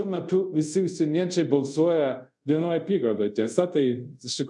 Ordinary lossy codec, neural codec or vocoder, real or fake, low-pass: MP3, 96 kbps; codec, 24 kHz, 0.5 kbps, DualCodec; fake; 10.8 kHz